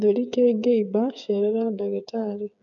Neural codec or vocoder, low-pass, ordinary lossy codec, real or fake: codec, 16 kHz, 8 kbps, FreqCodec, smaller model; 7.2 kHz; none; fake